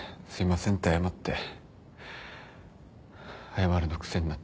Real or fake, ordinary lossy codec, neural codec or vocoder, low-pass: real; none; none; none